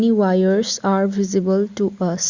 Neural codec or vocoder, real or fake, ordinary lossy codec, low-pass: none; real; Opus, 64 kbps; 7.2 kHz